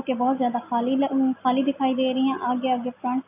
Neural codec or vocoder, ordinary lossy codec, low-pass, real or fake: none; AAC, 32 kbps; 3.6 kHz; real